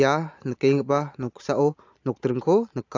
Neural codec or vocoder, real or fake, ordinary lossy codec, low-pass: none; real; AAC, 48 kbps; 7.2 kHz